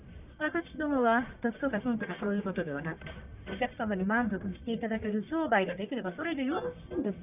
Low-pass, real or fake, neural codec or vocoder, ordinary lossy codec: 3.6 kHz; fake; codec, 44.1 kHz, 1.7 kbps, Pupu-Codec; none